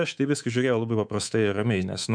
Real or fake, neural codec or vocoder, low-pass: fake; codec, 24 kHz, 3.1 kbps, DualCodec; 9.9 kHz